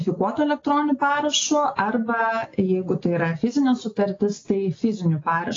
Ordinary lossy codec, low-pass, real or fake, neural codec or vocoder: AAC, 32 kbps; 7.2 kHz; real; none